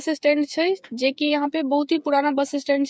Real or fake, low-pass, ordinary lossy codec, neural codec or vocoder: fake; none; none; codec, 16 kHz, 8 kbps, FreqCodec, smaller model